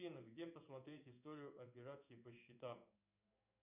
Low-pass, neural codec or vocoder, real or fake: 3.6 kHz; codec, 16 kHz in and 24 kHz out, 1 kbps, XY-Tokenizer; fake